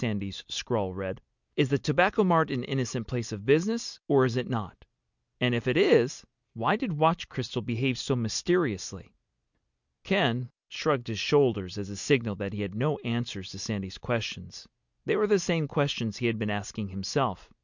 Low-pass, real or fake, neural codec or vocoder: 7.2 kHz; real; none